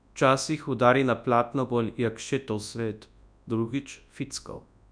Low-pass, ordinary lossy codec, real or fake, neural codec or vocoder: 9.9 kHz; none; fake; codec, 24 kHz, 0.9 kbps, WavTokenizer, large speech release